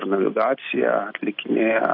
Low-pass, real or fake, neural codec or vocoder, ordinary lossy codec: 5.4 kHz; real; none; AAC, 32 kbps